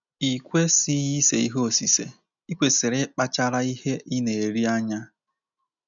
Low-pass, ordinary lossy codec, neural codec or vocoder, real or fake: 7.2 kHz; none; none; real